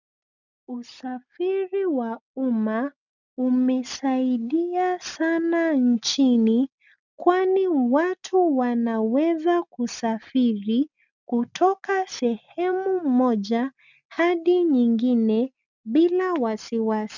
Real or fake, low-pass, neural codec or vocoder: real; 7.2 kHz; none